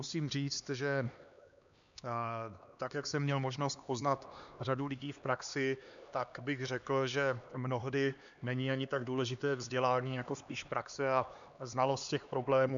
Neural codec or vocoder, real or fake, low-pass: codec, 16 kHz, 2 kbps, X-Codec, HuBERT features, trained on LibriSpeech; fake; 7.2 kHz